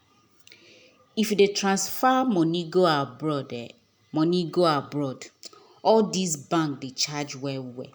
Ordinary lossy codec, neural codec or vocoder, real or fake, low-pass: none; none; real; none